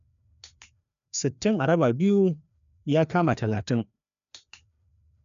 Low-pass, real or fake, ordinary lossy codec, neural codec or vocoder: 7.2 kHz; fake; none; codec, 16 kHz, 2 kbps, FreqCodec, larger model